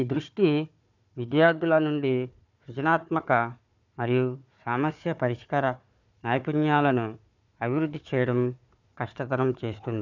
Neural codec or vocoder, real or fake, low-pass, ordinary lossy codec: codec, 16 kHz, 4 kbps, FunCodec, trained on Chinese and English, 50 frames a second; fake; 7.2 kHz; none